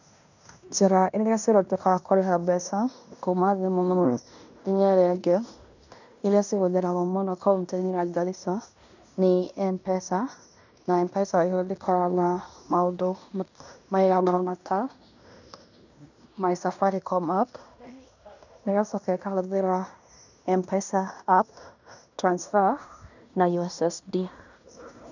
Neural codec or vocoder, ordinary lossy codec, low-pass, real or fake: codec, 16 kHz in and 24 kHz out, 0.9 kbps, LongCat-Audio-Codec, fine tuned four codebook decoder; none; 7.2 kHz; fake